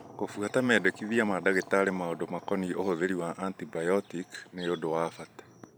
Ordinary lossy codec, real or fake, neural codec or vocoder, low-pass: none; fake; vocoder, 44.1 kHz, 128 mel bands every 512 samples, BigVGAN v2; none